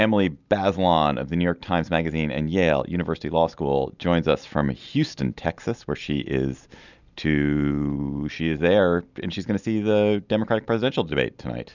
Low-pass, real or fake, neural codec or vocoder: 7.2 kHz; real; none